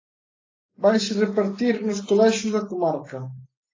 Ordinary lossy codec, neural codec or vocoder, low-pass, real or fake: AAC, 32 kbps; none; 7.2 kHz; real